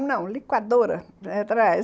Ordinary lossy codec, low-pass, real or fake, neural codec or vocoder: none; none; real; none